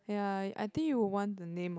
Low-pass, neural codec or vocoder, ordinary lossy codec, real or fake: none; none; none; real